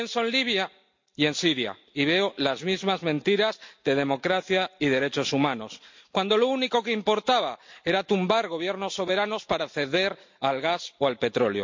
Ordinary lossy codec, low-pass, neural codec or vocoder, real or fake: MP3, 48 kbps; 7.2 kHz; none; real